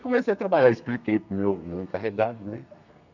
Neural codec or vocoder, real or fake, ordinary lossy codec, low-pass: codec, 32 kHz, 1.9 kbps, SNAC; fake; none; 7.2 kHz